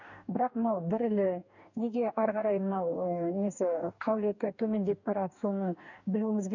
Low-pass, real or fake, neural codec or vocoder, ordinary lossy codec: 7.2 kHz; fake; codec, 44.1 kHz, 2.6 kbps, DAC; none